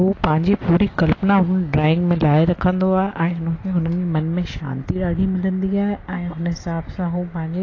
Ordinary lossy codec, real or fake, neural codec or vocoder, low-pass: AAC, 32 kbps; real; none; 7.2 kHz